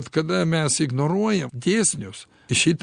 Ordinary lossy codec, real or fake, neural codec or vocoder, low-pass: Opus, 64 kbps; real; none; 9.9 kHz